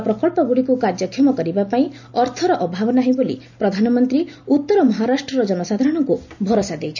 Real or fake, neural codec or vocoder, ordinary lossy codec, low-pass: real; none; none; 7.2 kHz